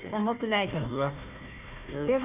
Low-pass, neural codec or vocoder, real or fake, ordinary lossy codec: 3.6 kHz; codec, 16 kHz, 1 kbps, FunCodec, trained on Chinese and English, 50 frames a second; fake; none